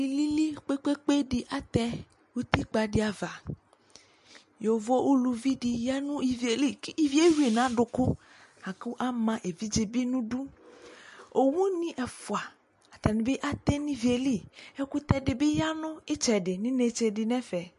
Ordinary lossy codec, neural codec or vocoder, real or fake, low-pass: MP3, 48 kbps; none; real; 14.4 kHz